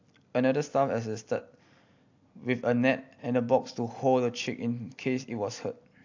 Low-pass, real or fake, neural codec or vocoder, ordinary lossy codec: 7.2 kHz; real; none; AAC, 48 kbps